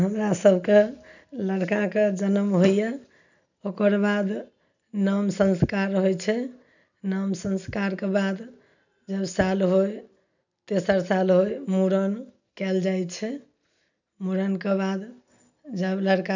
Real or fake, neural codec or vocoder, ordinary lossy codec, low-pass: real; none; none; 7.2 kHz